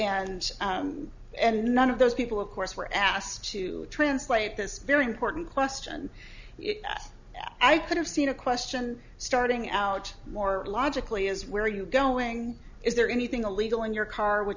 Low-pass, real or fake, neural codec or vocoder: 7.2 kHz; real; none